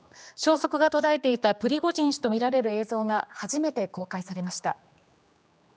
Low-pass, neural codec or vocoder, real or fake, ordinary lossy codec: none; codec, 16 kHz, 2 kbps, X-Codec, HuBERT features, trained on general audio; fake; none